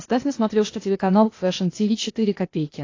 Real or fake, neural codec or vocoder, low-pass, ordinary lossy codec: fake; codec, 16 kHz, 0.8 kbps, ZipCodec; 7.2 kHz; AAC, 32 kbps